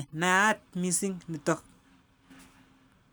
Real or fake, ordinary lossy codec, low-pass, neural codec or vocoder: real; none; none; none